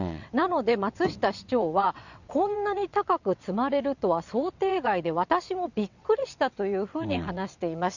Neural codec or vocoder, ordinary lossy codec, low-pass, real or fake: vocoder, 22.05 kHz, 80 mel bands, WaveNeXt; none; 7.2 kHz; fake